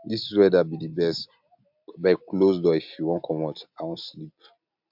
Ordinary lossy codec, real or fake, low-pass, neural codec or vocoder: MP3, 48 kbps; real; 5.4 kHz; none